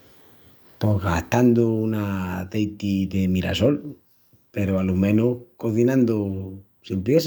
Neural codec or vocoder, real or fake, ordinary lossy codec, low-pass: codec, 44.1 kHz, 7.8 kbps, DAC; fake; none; none